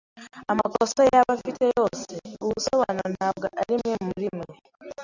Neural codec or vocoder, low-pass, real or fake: none; 7.2 kHz; real